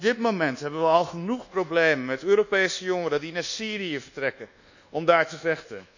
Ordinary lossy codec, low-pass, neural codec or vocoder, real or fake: none; 7.2 kHz; codec, 24 kHz, 1.2 kbps, DualCodec; fake